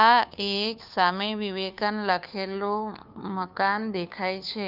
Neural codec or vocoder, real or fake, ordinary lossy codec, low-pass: codec, 16 kHz, 2 kbps, FunCodec, trained on Chinese and English, 25 frames a second; fake; none; 5.4 kHz